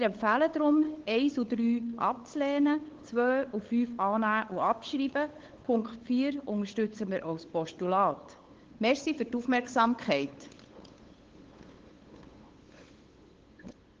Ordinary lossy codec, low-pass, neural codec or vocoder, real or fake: Opus, 16 kbps; 7.2 kHz; codec, 16 kHz, 8 kbps, FunCodec, trained on LibriTTS, 25 frames a second; fake